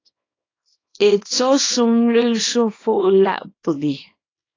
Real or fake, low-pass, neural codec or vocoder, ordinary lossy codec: fake; 7.2 kHz; codec, 24 kHz, 0.9 kbps, WavTokenizer, small release; AAC, 32 kbps